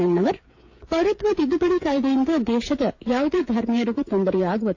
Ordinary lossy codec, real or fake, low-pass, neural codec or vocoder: AAC, 48 kbps; fake; 7.2 kHz; codec, 16 kHz, 8 kbps, FreqCodec, smaller model